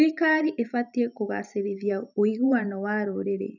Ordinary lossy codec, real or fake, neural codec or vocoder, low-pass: none; fake; vocoder, 44.1 kHz, 128 mel bands every 512 samples, BigVGAN v2; 7.2 kHz